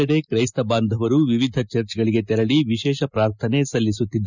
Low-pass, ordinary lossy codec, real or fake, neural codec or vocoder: 7.2 kHz; none; real; none